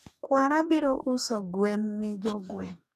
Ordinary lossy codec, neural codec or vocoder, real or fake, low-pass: none; codec, 44.1 kHz, 2.6 kbps, DAC; fake; 14.4 kHz